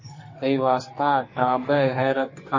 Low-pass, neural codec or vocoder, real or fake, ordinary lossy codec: 7.2 kHz; codec, 44.1 kHz, 2.6 kbps, SNAC; fake; MP3, 32 kbps